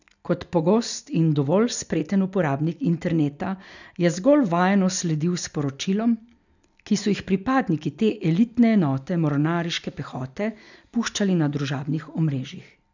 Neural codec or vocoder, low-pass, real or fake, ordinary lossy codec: none; 7.2 kHz; real; none